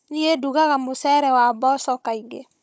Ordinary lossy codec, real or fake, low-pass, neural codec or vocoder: none; fake; none; codec, 16 kHz, 16 kbps, FunCodec, trained on Chinese and English, 50 frames a second